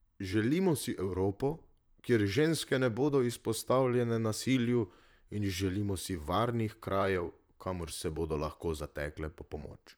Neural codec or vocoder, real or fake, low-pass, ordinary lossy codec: vocoder, 44.1 kHz, 128 mel bands, Pupu-Vocoder; fake; none; none